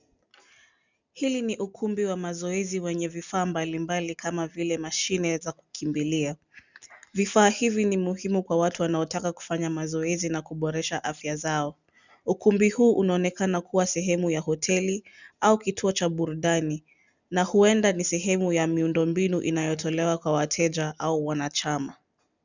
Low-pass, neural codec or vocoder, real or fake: 7.2 kHz; none; real